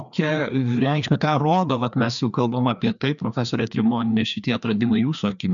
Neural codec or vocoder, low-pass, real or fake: codec, 16 kHz, 2 kbps, FreqCodec, larger model; 7.2 kHz; fake